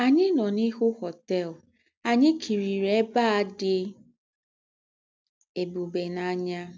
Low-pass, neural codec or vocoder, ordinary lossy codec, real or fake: none; none; none; real